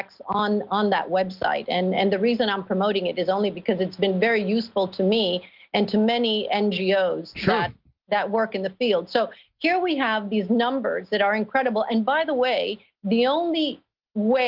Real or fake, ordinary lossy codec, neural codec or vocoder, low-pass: real; Opus, 32 kbps; none; 5.4 kHz